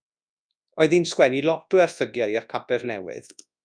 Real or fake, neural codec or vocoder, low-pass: fake; codec, 24 kHz, 0.9 kbps, WavTokenizer, large speech release; 9.9 kHz